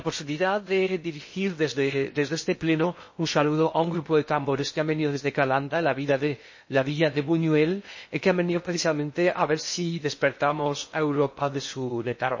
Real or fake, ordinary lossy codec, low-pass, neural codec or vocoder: fake; MP3, 32 kbps; 7.2 kHz; codec, 16 kHz in and 24 kHz out, 0.6 kbps, FocalCodec, streaming, 2048 codes